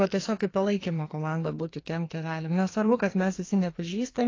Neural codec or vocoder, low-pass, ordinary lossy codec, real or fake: codec, 44.1 kHz, 2.6 kbps, SNAC; 7.2 kHz; AAC, 32 kbps; fake